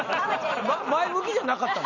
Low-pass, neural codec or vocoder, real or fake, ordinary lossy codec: 7.2 kHz; none; real; none